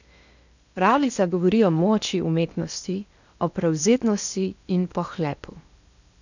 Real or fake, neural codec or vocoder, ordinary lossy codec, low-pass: fake; codec, 16 kHz in and 24 kHz out, 0.8 kbps, FocalCodec, streaming, 65536 codes; none; 7.2 kHz